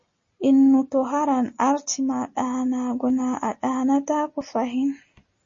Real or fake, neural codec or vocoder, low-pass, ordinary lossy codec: real; none; 7.2 kHz; MP3, 32 kbps